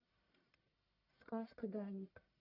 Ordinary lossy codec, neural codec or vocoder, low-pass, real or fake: Opus, 64 kbps; codec, 44.1 kHz, 1.7 kbps, Pupu-Codec; 5.4 kHz; fake